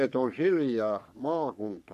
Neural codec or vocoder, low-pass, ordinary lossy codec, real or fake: codec, 44.1 kHz, 3.4 kbps, Pupu-Codec; 14.4 kHz; none; fake